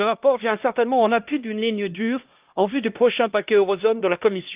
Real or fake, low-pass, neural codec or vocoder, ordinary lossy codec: fake; 3.6 kHz; codec, 16 kHz, 1 kbps, X-Codec, HuBERT features, trained on LibriSpeech; Opus, 32 kbps